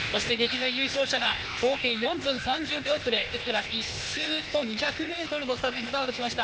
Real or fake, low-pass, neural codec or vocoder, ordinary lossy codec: fake; none; codec, 16 kHz, 0.8 kbps, ZipCodec; none